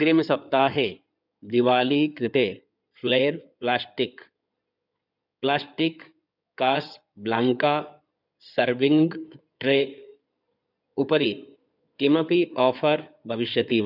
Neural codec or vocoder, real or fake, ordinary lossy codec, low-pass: codec, 16 kHz in and 24 kHz out, 2.2 kbps, FireRedTTS-2 codec; fake; none; 5.4 kHz